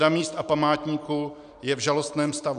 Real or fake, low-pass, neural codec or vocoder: real; 9.9 kHz; none